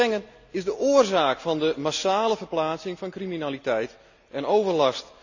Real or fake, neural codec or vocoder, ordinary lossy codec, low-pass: real; none; none; 7.2 kHz